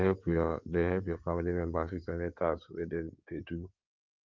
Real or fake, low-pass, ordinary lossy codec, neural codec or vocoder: fake; 7.2 kHz; Opus, 24 kbps; codec, 16 kHz in and 24 kHz out, 2.2 kbps, FireRedTTS-2 codec